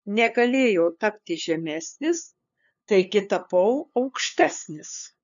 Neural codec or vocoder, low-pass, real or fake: codec, 16 kHz, 2 kbps, FunCodec, trained on LibriTTS, 25 frames a second; 7.2 kHz; fake